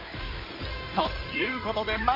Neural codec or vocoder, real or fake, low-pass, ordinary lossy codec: codec, 16 kHz in and 24 kHz out, 2.2 kbps, FireRedTTS-2 codec; fake; 5.4 kHz; MP3, 32 kbps